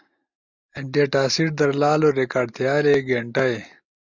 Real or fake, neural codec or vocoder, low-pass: real; none; 7.2 kHz